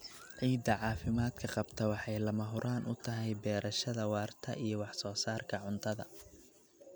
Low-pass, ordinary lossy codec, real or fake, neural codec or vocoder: none; none; real; none